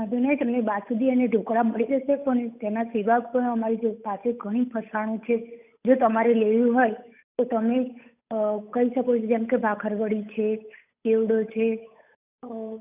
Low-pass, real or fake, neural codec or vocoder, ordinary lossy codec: 3.6 kHz; fake; codec, 16 kHz, 8 kbps, FunCodec, trained on Chinese and English, 25 frames a second; none